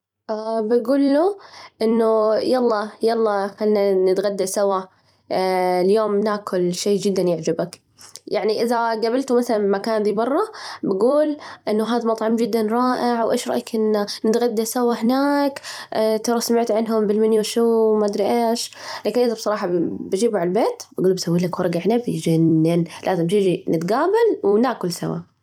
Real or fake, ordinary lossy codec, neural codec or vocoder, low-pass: fake; none; vocoder, 44.1 kHz, 128 mel bands every 256 samples, BigVGAN v2; 19.8 kHz